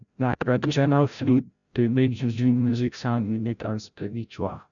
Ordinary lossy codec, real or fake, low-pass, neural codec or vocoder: none; fake; 7.2 kHz; codec, 16 kHz, 0.5 kbps, FreqCodec, larger model